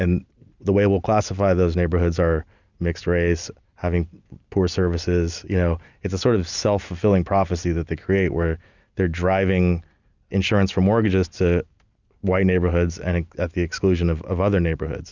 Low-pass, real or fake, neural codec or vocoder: 7.2 kHz; real; none